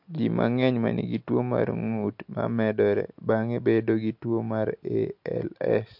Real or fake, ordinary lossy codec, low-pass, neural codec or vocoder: real; MP3, 48 kbps; 5.4 kHz; none